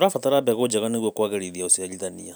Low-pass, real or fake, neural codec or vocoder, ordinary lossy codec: none; real; none; none